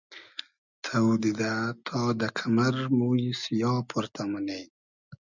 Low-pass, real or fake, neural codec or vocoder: 7.2 kHz; real; none